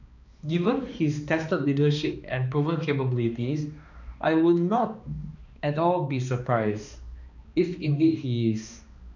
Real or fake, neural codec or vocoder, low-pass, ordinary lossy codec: fake; codec, 16 kHz, 2 kbps, X-Codec, HuBERT features, trained on balanced general audio; 7.2 kHz; none